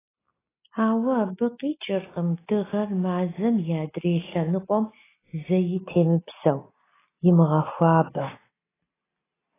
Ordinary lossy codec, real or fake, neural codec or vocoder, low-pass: AAC, 16 kbps; real; none; 3.6 kHz